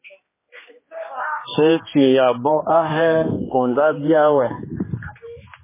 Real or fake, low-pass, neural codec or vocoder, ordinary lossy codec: fake; 3.6 kHz; codec, 16 kHz, 2 kbps, X-Codec, HuBERT features, trained on general audio; MP3, 16 kbps